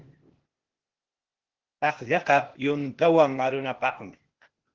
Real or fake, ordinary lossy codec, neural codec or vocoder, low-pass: fake; Opus, 16 kbps; codec, 16 kHz, 0.8 kbps, ZipCodec; 7.2 kHz